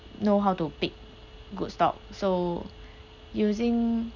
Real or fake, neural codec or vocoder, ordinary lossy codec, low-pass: real; none; none; 7.2 kHz